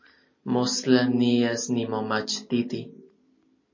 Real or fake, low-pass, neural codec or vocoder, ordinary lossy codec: real; 7.2 kHz; none; MP3, 32 kbps